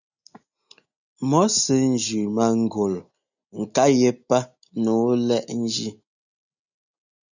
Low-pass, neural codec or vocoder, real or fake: 7.2 kHz; none; real